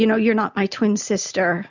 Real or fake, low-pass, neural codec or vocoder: real; 7.2 kHz; none